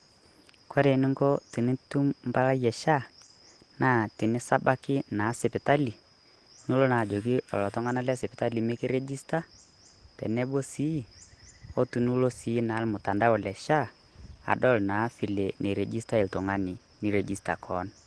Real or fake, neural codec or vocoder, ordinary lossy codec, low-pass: real; none; Opus, 16 kbps; 10.8 kHz